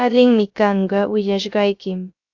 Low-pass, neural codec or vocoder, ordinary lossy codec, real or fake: 7.2 kHz; codec, 16 kHz, 0.3 kbps, FocalCodec; AAC, 48 kbps; fake